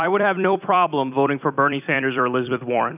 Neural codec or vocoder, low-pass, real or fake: vocoder, 44.1 kHz, 128 mel bands every 256 samples, BigVGAN v2; 3.6 kHz; fake